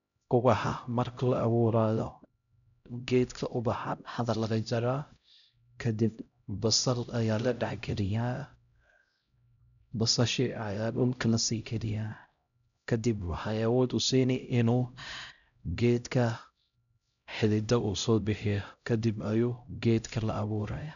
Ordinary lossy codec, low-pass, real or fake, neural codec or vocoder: none; 7.2 kHz; fake; codec, 16 kHz, 0.5 kbps, X-Codec, HuBERT features, trained on LibriSpeech